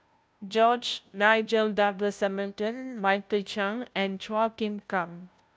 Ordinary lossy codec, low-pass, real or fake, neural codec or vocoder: none; none; fake; codec, 16 kHz, 0.5 kbps, FunCodec, trained on Chinese and English, 25 frames a second